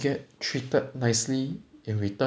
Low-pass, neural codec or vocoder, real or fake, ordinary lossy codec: none; none; real; none